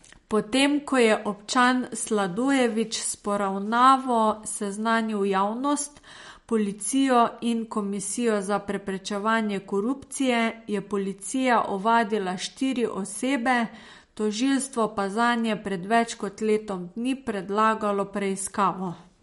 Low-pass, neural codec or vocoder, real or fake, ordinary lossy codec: 19.8 kHz; none; real; MP3, 48 kbps